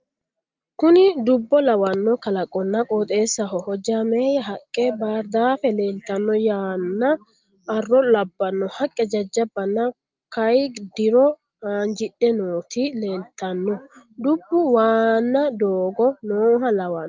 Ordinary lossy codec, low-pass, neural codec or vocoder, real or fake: Opus, 32 kbps; 7.2 kHz; none; real